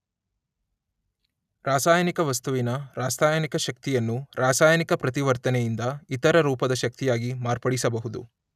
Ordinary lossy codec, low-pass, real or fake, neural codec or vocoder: none; 14.4 kHz; real; none